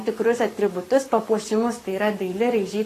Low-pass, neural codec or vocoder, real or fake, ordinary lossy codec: 14.4 kHz; codec, 44.1 kHz, 7.8 kbps, Pupu-Codec; fake; AAC, 48 kbps